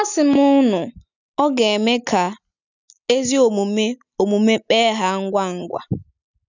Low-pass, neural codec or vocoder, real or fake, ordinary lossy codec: 7.2 kHz; none; real; none